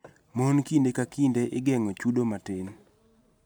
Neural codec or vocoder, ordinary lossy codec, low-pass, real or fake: none; none; none; real